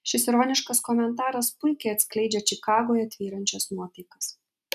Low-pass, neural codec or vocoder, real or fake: 14.4 kHz; none; real